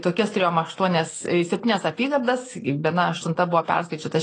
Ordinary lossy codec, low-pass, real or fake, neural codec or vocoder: AAC, 32 kbps; 10.8 kHz; real; none